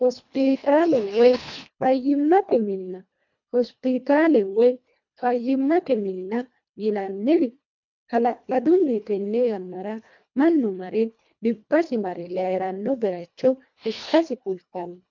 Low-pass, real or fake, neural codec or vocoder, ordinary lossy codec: 7.2 kHz; fake; codec, 24 kHz, 1.5 kbps, HILCodec; MP3, 64 kbps